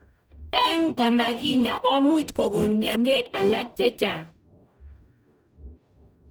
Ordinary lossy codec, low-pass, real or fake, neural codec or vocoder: none; none; fake; codec, 44.1 kHz, 0.9 kbps, DAC